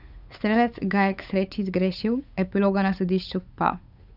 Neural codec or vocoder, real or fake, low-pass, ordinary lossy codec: codec, 16 kHz, 8 kbps, FunCodec, trained on Chinese and English, 25 frames a second; fake; 5.4 kHz; none